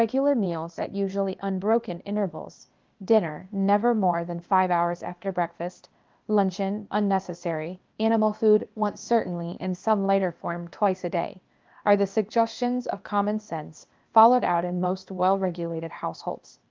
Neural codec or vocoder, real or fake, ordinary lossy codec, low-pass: codec, 16 kHz, 0.8 kbps, ZipCodec; fake; Opus, 24 kbps; 7.2 kHz